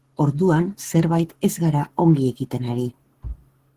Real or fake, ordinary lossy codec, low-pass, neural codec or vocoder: fake; Opus, 16 kbps; 14.4 kHz; codec, 44.1 kHz, 7.8 kbps, Pupu-Codec